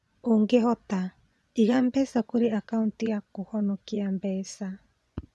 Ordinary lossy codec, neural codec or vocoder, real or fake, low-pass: none; vocoder, 24 kHz, 100 mel bands, Vocos; fake; none